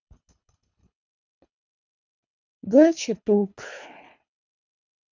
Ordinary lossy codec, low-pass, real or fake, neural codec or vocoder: none; 7.2 kHz; fake; codec, 24 kHz, 3 kbps, HILCodec